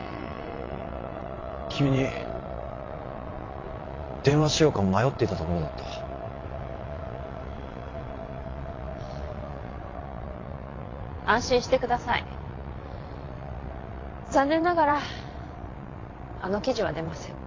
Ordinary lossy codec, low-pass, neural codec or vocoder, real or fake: AAC, 32 kbps; 7.2 kHz; vocoder, 22.05 kHz, 80 mel bands, WaveNeXt; fake